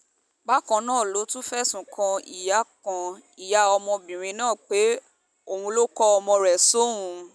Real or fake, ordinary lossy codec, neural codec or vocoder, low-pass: real; none; none; none